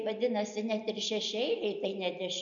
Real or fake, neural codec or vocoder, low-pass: real; none; 7.2 kHz